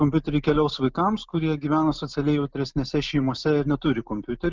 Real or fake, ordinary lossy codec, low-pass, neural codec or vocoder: real; Opus, 24 kbps; 7.2 kHz; none